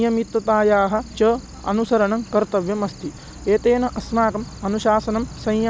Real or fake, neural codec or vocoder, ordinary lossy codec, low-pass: real; none; none; none